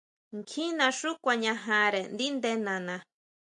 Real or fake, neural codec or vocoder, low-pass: real; none; 9.9 kHz